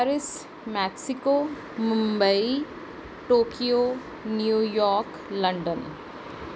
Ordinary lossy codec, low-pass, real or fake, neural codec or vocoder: none; none; real; none